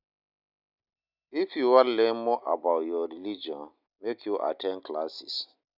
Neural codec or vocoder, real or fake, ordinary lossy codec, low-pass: none; real; none; 5.4 kHz